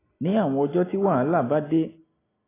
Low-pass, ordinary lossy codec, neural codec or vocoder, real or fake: 3.6 kHz; AAC, 16 kbps; none; real